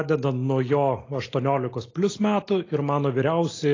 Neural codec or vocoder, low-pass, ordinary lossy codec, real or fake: none; 7.2 kHz; AAC, 32 kbps; real